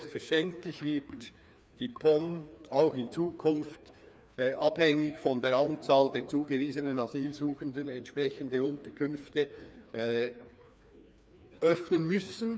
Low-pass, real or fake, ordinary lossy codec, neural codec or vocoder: none; fake; none; codec, 16 kHz, 2 kbps, FreqCodec, larger model